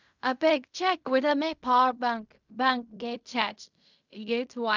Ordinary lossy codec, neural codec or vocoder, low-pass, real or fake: none; codec, 16 kHz in and 24 kHz out, 0.4 kbps, LongCat-Audio-Codec, fine tuned four codebook decoder; 7.2 kHz; fake